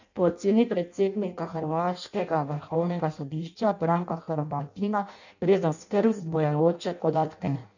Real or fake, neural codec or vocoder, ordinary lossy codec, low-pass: fake; codec, 16 kHz in and 24 kHz out, 0.6 kbps, FireRedTTS-2 codec; none; 7.2 kHz